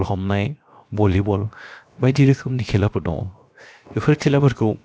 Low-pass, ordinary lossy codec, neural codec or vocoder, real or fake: none; none; codec, 16 kHz, 0.7 kbps, FocalCodec; fake